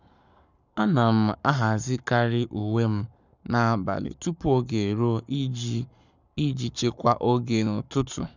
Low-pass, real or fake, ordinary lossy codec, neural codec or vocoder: 7.2 kHz; fake; none; codec, 44.1 kHz, 7.8 kbps, Pupu-Codec